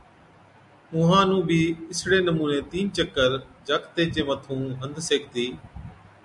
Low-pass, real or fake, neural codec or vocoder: 10.8 kHz; real; none